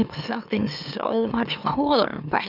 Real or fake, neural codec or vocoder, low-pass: fake; autoencoder, 44.1 kHz, a latent of 192 numbers a frame, MeloTTS; 5.4 kHz